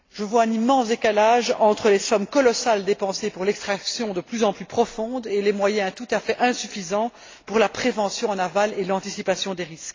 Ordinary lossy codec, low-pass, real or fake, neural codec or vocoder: AAC, 32 kbps; 7.2 kHz; real; none